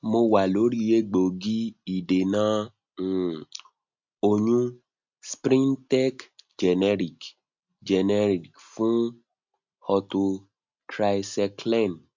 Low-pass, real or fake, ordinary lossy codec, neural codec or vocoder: 7.2 kHz; real; MP3, 64 kbps; none